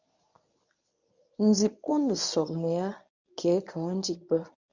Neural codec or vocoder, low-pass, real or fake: codec, 24 kHz, 0.9 kbps, WavTokenizer, medium speech release version 1; 7.2 kHz; fake